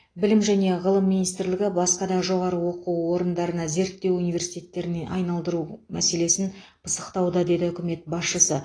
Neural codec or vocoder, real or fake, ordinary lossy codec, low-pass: none; real; AAC, 32 kbps; 9.9 kHz